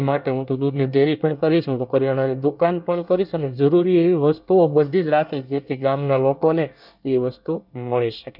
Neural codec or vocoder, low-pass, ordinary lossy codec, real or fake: codec, 24 kHz, 1 kbps, SNAC; 5.4 kHz; none; fake